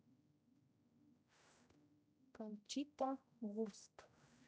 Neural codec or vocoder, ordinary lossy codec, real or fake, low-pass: codec, 16 kHz, 0.5 kbps, X-Codec, HuBERT features, trained on general audio; none; fake; none